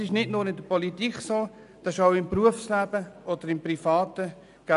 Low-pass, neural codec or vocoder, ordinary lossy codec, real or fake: 10.8 kHz; none; none; real